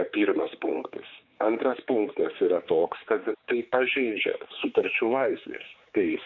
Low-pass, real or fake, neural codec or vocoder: 7.2 kHz; fake; codec, 16 kHz, 4 kbps, X-Codec, HuBERT features, trained on general audio